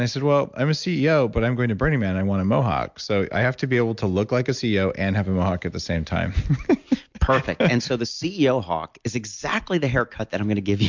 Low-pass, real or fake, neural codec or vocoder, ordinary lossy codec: 7.2 kHz; real; none; MP3, 64 kbps